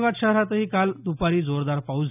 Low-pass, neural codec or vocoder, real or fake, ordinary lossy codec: 3.6 kHz; none; real; none